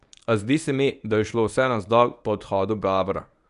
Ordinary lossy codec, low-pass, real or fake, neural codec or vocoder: none; 10.8 kHz; fake; codec, 24 kHz, 0.9 kbps, WavTokenizer, medium speech release version 1